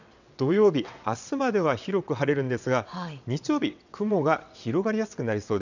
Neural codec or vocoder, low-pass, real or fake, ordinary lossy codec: vocoder, 22.05 kHz, 80 mel bands, WaveNeXt; 7.2 kHz; fake; none